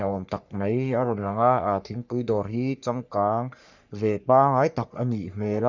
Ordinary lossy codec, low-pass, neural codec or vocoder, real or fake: none; 7.2 kHz; codec, 44.1 kHz, 3.4 kbps, Pupu-Codec; fake